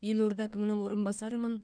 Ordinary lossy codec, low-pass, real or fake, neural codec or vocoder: none; 9.9 kHz; fake; codec, 24 kHz, 1 kbps, SNAC